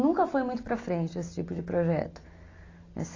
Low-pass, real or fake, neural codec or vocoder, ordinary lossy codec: 7.2 kHz; real; none; AAC, 32 kbps